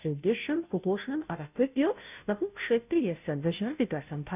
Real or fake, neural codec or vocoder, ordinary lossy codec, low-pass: fake; codec, 16 kHz, 0.5 kbps, FunCodec, trained on Chinese and English, 25 frames a second; none; 3.6 kHz